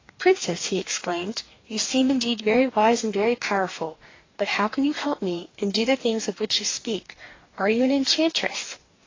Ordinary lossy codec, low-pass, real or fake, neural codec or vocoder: AAC, 32 kbps; 7.2 kHz; fake; codec, 44.1 kHz, 2.6 kbps, DAC